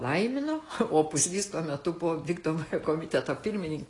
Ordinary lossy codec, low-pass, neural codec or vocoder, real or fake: AAC, 32 kbps; 10.8 kHz; none; real